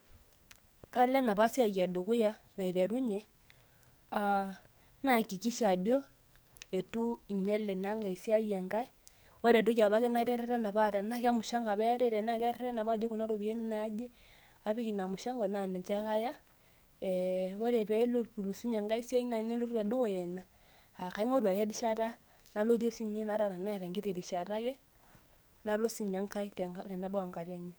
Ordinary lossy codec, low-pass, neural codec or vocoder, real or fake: none; none; codec, 44.1 kHz, 2.6 kbps, SNAC; fake